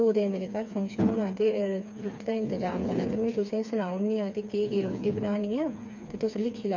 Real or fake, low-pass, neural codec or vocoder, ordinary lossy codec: fake; 7.2 kHz; codec, 16 kHz, 4 kbps, FreqCodec, smaller model; none